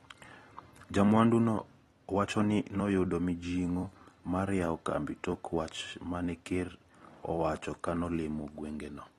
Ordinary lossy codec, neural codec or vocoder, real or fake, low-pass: AAC, 32 kbps; vocoder, 44.1 kHz, 128 mel bands every 256 samples, BigVGAN v2; fake; 19.8 kHz